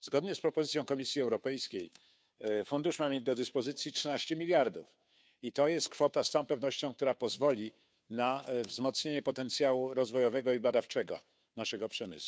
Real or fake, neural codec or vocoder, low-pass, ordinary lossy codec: fake; codec, 16 kHz, 2 kbps, FunCodec, trained on Chinese and English, 25 frames a second; none; none